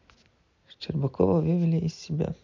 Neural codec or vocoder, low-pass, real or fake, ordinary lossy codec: none; 7.2 kHz; real; MP3, 48 kbps